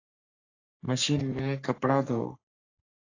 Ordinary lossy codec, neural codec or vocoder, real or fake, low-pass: AAC, 32 kbps; codec, 44.1 kHz, 3.4 kbps, Pupu-Codec; fake; 7.2 kHz